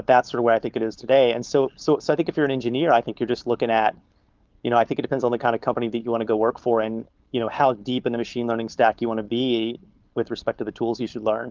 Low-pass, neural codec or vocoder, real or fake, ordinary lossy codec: 7.2 kHz; codec, 16 kHz, 4.8 kbps, FACodec; fake; Opus, 32 kbps